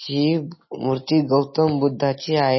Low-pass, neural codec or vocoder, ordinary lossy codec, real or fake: 7.2 kHz; none; MP3, 24 kbps; real